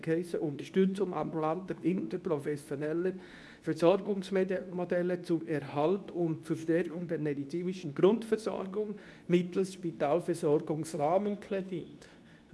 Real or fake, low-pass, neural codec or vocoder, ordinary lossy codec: fake; none; codec, 24 kHz, 0.9 kbps, WavTokenizer, medium speech release version 2; none